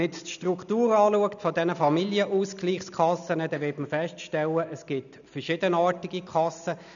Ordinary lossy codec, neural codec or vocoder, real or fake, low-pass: none; none; real; 7.2 kHz